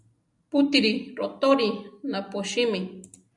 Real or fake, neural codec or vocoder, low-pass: real; none; 10.8 kHz